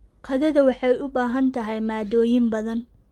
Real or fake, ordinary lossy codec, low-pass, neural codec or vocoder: fake; Opus, 32 kbps; 19.8 kHz; codec, 44.1 kHz, 7.8 kbps, Pupu-Codec